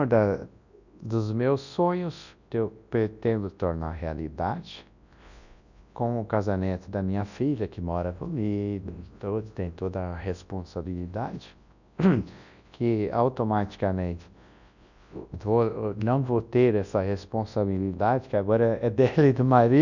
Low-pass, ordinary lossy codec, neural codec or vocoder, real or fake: 7.2 kHz; none; codec, 24 kHz, 0.9 kbps, WavTokenizer, large speech release; fake